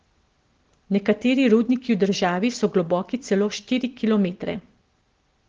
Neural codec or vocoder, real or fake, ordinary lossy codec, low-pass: none; real; Opus, 16 kbps; 7.2 kHz